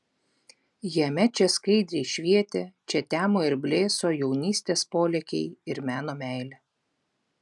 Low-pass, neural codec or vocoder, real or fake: 10.8 kHz; none; real